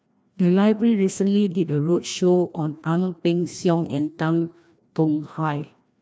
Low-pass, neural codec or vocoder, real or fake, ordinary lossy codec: none; codec, 16 kHz, 1 kbps, FreqCodec, larger model; fake; none